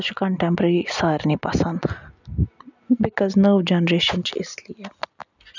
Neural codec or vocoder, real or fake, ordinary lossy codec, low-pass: none; real; none; 7.2 kHz